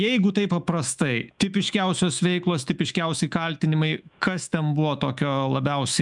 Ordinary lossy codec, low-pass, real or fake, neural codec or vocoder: MP3, 96 kbps; 10.8 kHz; fake; autoencoder, 48 kHz, 128 numbers a frame, DAC-VAE, trained on Japanese speech